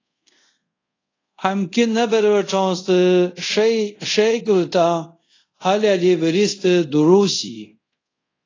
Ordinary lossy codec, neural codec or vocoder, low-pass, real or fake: AAC, 32 kbps; codec, 24 kHz, 0.5 kbps, DualCodec; 7.2 kHz; fake